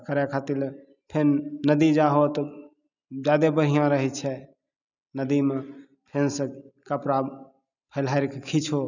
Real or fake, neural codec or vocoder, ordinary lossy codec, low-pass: real; none; none; 7.2 kHz